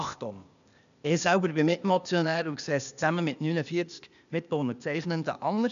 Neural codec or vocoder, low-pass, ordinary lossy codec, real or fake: codec, 16 kHz, 0.8 kbps, ZipCodec; 7.2 kHz; none; fake